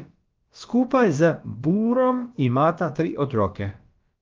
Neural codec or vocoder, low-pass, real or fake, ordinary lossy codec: codec, 16 kHz, about 1 kbps, DyCAST, with the encoder's durations; 7.2 kHz; fake; Opus, 24 kbps